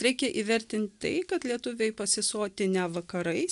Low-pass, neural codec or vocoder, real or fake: 10.8 kHz; none; real